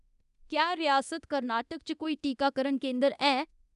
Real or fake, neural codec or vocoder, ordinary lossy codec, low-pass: fake; codec, 24 kHz, 0.9 kbps, DualCodec; none; 10.8 kHz